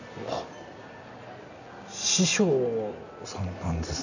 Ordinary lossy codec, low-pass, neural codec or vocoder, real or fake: none; 7.2 kHz; none; real